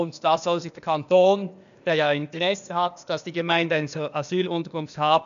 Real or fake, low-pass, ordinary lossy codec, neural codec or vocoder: fake; 7.2 kHz; none; codec, 16 kHz, 0.8 kbps, ZipCodec